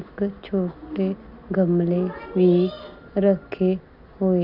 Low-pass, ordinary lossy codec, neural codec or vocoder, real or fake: 5.4 kHz; none; none; real